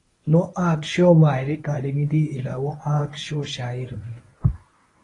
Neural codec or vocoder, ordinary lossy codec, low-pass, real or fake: codec, 24 kHz, 0.9 kbps, WavTokenizer, medium speech release version 1; AAC, 32 kbps; 10.8 kHz; fake